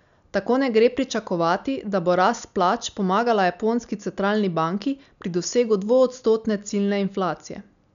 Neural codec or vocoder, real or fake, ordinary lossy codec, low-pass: none; real; none; 7.2 kHz